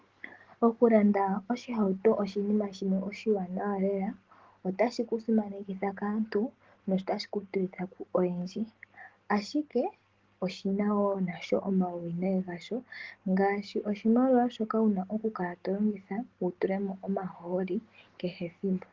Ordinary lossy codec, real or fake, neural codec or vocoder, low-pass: Opus, 16 kbps; real; none; 7.2 kHz